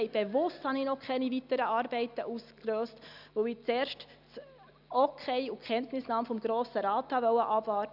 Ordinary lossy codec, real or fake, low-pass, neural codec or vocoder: none; real; 5.4 kHz; none